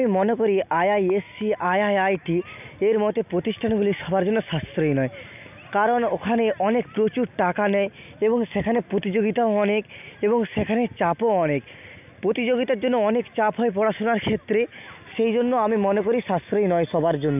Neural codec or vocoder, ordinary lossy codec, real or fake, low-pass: none; none; real; 3.6 kHz